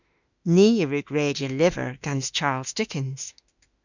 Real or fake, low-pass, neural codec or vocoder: fake; 7.2 kHz; autoencoder, 48 kHz, 32 numbers a frame, DAC-VAE, trained on Japanese speech